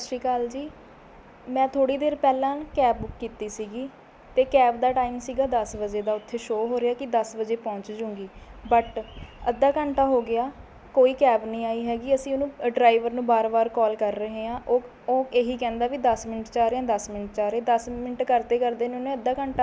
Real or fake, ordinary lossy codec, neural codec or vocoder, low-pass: real; none; none; none